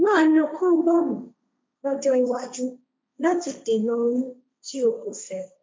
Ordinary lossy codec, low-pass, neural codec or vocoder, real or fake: none; none; codec, 16 kHz, 1.1 kbps, Voila-Tokenizer; fake